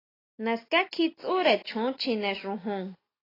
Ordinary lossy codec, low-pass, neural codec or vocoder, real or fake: AAC, 24 kbps; 5.4 kHz; none; real